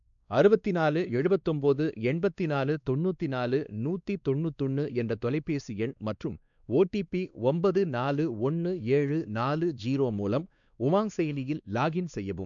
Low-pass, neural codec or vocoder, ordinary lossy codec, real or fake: 7.2 kHz; codec, 16 kHz, 2 kbps, X-Codec, WavLM features, trained on Multilingual LibriSpeech; none; fake